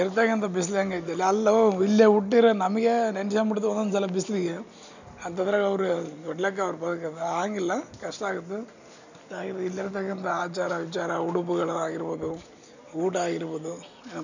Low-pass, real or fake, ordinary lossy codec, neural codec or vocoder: 7.2 kHz; real; none; none